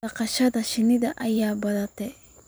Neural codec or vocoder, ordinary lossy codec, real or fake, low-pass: vocoder, 44.1 kHz, 128 mel bands every 512 samples, BigVGAN v2; none; fake; none